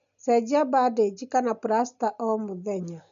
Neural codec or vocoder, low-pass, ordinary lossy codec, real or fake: none; 7.2 kHz; MP3, 96 kbps; real